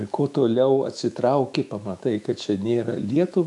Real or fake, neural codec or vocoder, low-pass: fake; autoencoder, 48 kHz, 128 numbers a frame, DAC-VAE, trained on Japanese speech; 10.8 kHz